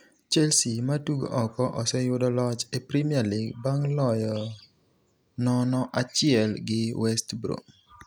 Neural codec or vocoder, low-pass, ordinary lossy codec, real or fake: none; none; none; real